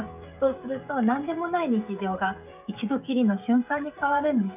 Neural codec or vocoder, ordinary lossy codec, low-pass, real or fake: codec, 16 kHz, 16 kbps, FreqCodec, smaller model; none; 3.6 kHz; fake